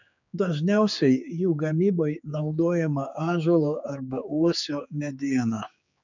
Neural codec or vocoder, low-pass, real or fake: codec, 16 kHz, 4 kbps, X-Codec, HuBERT features, trained on general audio; 7.2 kHz; fake